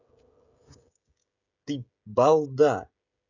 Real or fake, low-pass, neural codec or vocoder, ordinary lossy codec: fake; 7.2 kHz; codec, 16 kHz, 16 kbps, FreqCodec, smaller model; none